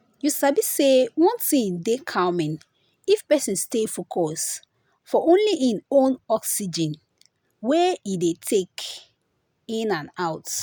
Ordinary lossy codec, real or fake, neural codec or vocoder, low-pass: none; real; none; none